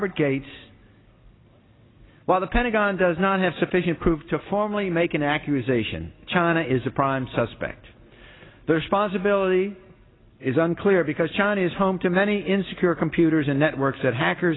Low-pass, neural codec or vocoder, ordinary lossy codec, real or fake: 7.2 kHz; none; AAC, 16 kbps; real